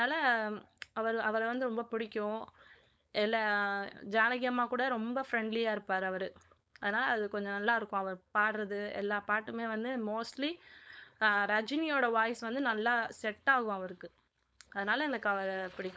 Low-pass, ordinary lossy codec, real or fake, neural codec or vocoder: none; none; fake; codec, 16 kHz, 4.8 kbps, FACodec